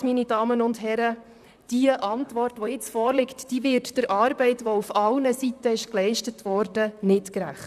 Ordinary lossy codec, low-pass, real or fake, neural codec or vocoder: none; 14.4 kHz; fake; vocoder, 44.1 kHz, 128 mel bands, Pupu-Vocoder